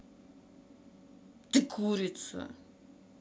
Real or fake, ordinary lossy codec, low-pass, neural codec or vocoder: real; none; none; none